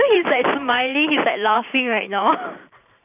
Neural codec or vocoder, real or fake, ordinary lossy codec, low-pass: vocoder, 44.1 kHz, 128 mel bands every 512 samples, BigVGAN v2; fake; none; 3.6 kHz